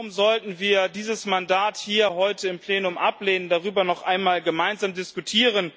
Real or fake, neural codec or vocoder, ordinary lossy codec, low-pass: real; none; none; none